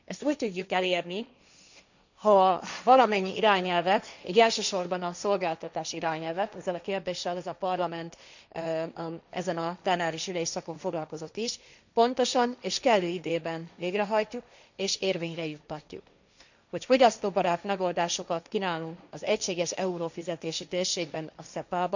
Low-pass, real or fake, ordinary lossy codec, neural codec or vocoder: 7.2 kHz; fake; none; codec, 16 kHz, 1.1 kbps, Voila-Tokenizer